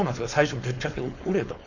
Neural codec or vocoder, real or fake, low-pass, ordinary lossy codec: codec, 16 kHz, 4.8 kbps, FACodec; fake; 7.2 kHz; none